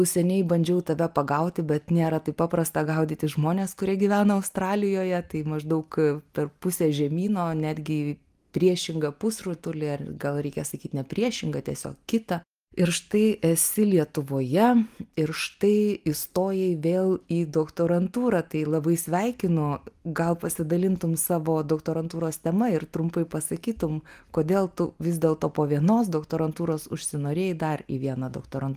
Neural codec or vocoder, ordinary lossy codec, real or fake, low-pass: none; Opus, 32 kbps; real; 14.4 kHz